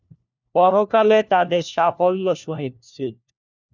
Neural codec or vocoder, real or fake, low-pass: codec, 16 kHz, 1 kbps, FunCodec, trained on LibriTTS, 50 frames a second; fake; 7.2 kHz